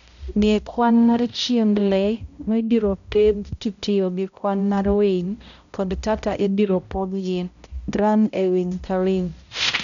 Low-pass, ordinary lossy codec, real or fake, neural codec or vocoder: 7.2 kHz; none; fake; codec, 16 kHz, 0.5 kbps, X-Codec, HuBERT features, trained on balanced general audio